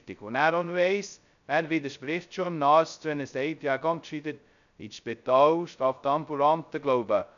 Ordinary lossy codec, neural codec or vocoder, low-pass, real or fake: none; codec, 16 kHz, 0.2 kbps, FocalCodec; 7.2 kHz; fake